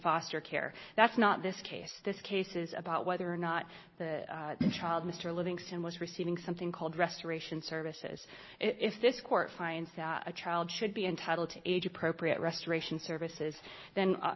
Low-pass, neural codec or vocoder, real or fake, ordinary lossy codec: 7.2 kHz; none; real; MP3, 24 kbps